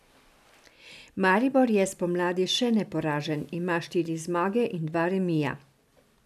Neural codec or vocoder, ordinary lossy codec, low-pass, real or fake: none; none; 14.4 kHz; real